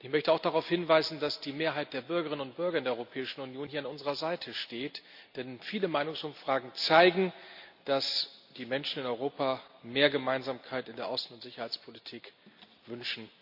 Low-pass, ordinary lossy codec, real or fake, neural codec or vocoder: 5.4 kHz; none; real; none